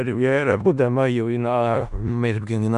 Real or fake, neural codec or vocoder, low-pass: fake; codec, 16 kHz in and 24 kHz out, 0.4 kbps, LongCat-Audio-Codec, four codebook decoder; 10.8 kHz